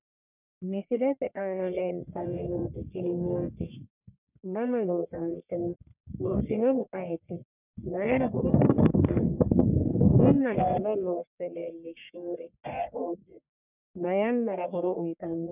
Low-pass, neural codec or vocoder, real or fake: 3.6 kHz; codec, 44.1 kHz, 1.7 kbps, Pupu-Codec; fake